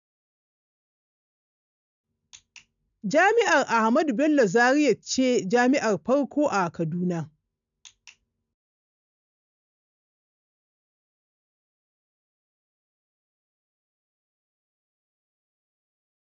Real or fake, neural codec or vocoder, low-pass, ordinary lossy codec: real; none; 7.2 kHz; none